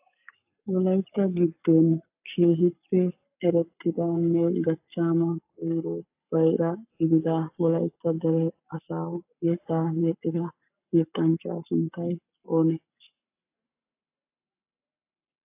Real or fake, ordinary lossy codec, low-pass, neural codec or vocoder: fake; AAC, 32 kbps; 3.6 kHz; codec, 44.1 kHz, 7.8 kbps, Pupu-Codec